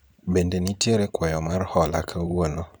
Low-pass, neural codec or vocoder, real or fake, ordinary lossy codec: none; vocoder, 44.1 kHz, 128 mel bands every 512 samples, BigVGAN v2; fake; none